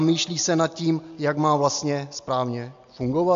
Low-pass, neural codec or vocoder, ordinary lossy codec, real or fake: 7.2 kHz; none; AAC, 64 kbps; real